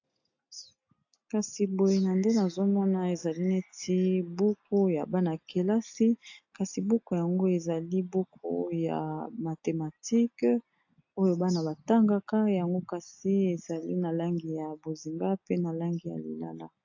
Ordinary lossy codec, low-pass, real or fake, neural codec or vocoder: AAC, 48 kbps; 7.2 kHz; real; none